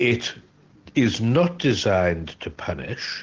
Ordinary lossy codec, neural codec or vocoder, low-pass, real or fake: Opus, 16 kbps; none; 7.2 kHz; real